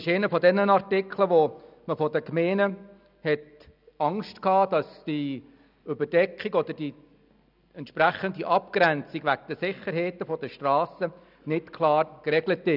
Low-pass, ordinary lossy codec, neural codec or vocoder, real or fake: 5.4 kHz; none; none; real